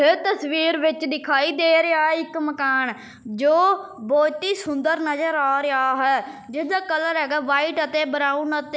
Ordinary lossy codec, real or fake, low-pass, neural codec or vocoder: none; real; none; none